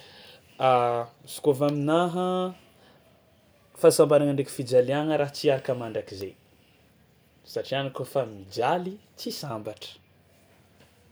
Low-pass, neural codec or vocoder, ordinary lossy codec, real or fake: none; none; none; real